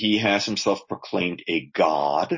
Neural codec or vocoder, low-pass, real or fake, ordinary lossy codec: none; 7.2 kHz; real; MP3, 32 kbps